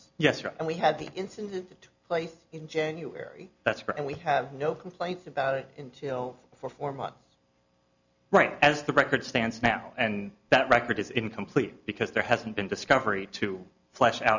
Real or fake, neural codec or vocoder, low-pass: real; none; 7.2 kHz